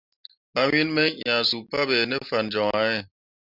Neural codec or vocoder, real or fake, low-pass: none; real; 5.4 kHz